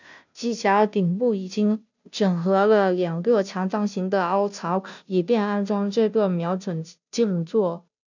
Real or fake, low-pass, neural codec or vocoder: fake; 7.2 kHz; codec, 16 kHz, 0.5 kbps, FunCodec, trained on Chinese and English, 25 frames a second